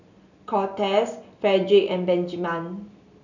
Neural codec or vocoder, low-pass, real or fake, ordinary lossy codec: none; 7.2 kHz; real; none